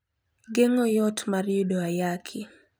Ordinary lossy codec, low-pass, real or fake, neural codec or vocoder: none; none; fake; vocoder, 44.1 kHz, 128 mel bands every 256 samples, BigVGAN v2